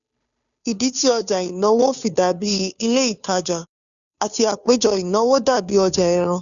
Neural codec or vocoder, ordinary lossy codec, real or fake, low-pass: codec, 16 kHz, 2 kbps, FunCodec, trained on Chinese and English, 25 frames a second; none; fake; 7.2 kHz